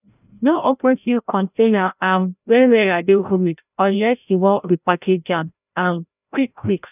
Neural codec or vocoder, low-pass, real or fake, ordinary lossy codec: codec, 16 kHz, 0.5 kbps, FreqCodec, larger model; 3.6 kHz; fake; none